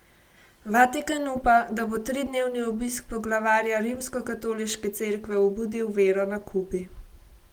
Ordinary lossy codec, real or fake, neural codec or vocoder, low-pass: Opus, 24 kbps; real; none; 19.8 kHz